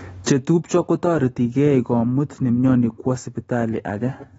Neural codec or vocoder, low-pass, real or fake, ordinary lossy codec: autoencoder, 48 kHz, 128 numbers a frame, DAC-VAE, trained on Japanese speech; 19.8 kHz; fake; AAC, 24 kbps